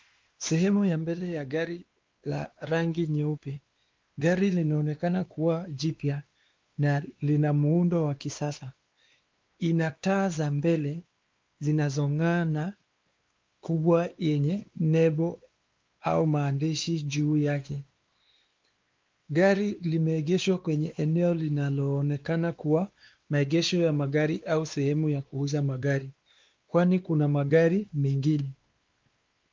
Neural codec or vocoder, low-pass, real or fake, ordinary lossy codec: codec, 16 kHz, 2 kbps, X-Codec, WavLM features, trained on Multilingual LibriSpeech; 7.2 kHz; fake; Opus, 32 kbps